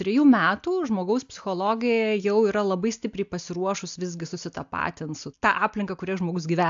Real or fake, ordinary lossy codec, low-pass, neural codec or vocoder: real; AAC, 64 kbps; 7.2 kHz; none